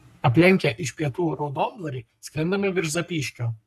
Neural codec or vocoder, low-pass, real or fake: codec, 44.1 kHz, 3.4 kbps, Pupu-Codec; 14.4 kHz; fake